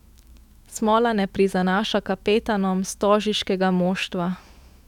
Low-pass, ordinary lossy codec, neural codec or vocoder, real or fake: 19.8 kHz; none; autoencoder, 48 kHz, 128 numbers a frame, DAC-VAE, trained on Japanese speech; fake